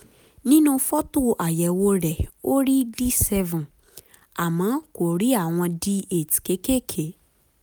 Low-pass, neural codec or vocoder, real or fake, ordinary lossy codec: none; none; real; none